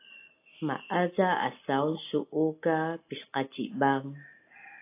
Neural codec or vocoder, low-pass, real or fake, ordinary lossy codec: none; 3.6 kHz; real; AAC, 24 kbps